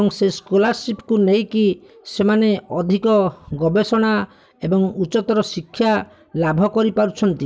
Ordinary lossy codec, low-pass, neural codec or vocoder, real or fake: none; none; none; real